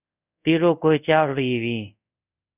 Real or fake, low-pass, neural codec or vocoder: fake; 3.6 kHz; codec, 24 kHz, 0.5 kbps, DualCodec